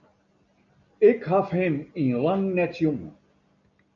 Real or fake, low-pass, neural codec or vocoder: real; 7.2 kHz; none